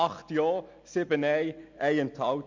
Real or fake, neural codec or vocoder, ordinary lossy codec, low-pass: real; none; MP3, 64 kbps; 7.2 kHz